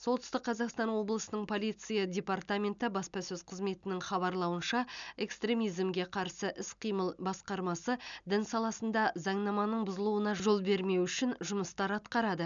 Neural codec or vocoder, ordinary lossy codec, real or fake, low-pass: none; none; real; 7.2 kHz